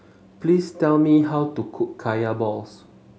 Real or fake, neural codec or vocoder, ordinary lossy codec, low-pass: real; none; none; none